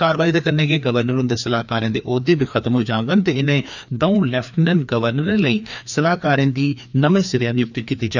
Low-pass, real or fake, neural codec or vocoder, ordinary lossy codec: 7.2 kHz; fake; codec, 16 kHz, 2 kbps, FreqCodec, larger model; none